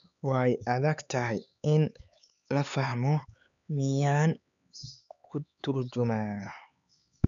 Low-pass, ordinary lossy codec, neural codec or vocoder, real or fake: 7.2 kHz; MP3, 96 kbps; codec, 16 kHz, 4 kbps, X-Codec, HuBERT features, trained on LibriSpeech; fake